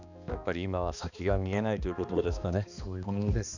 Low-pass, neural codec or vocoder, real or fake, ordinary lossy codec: 7.2 kHz; codec, 16 kHz, 2 kbps, X-Codec, HuBERT features, trained on balanced general audio; fake; none